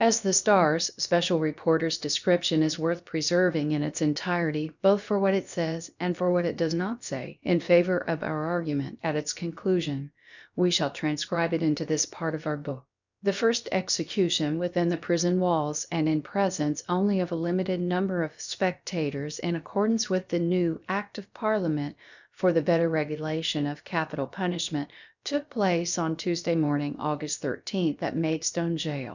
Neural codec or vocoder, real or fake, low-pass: codec, 16 kHz, about 1 kbps, DyCAST, with the encoder's durations; fake; 7.2 kHz